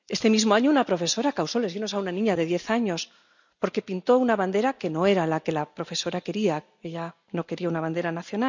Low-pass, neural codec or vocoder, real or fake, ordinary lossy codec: 7.2 kHz; none; real; none